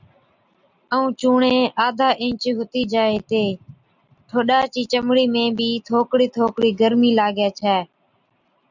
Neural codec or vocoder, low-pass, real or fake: none; 7.2 kHz; real